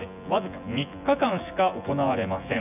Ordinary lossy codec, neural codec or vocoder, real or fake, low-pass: none; vocoder, 24 kHz, 100 mel bands, Vocos; fake; 3.6 kHz